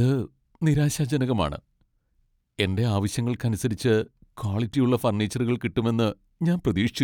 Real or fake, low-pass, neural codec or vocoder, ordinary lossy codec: real; 19.8 kHz; none; none